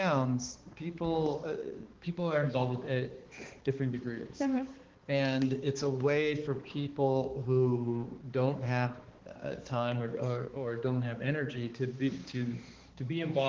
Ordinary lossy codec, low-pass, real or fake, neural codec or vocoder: Opus, 16 kbps; 7.2 kHz; fake; codec, 16 kHz, 2 kbps, X-Codec, HuBERT features, trained on balanced general audio